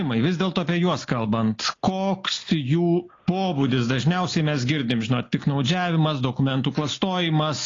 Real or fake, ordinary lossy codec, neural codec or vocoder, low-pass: real; AAC, 32 kbps; none; 7.2 kHz